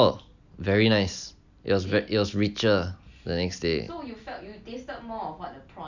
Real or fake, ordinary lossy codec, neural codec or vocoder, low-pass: real; none; none; 7.2 kHz